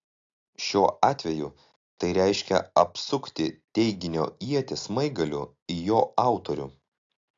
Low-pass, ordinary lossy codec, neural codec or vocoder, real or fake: 7.2 kHz; AAC, 48 kbps; none; real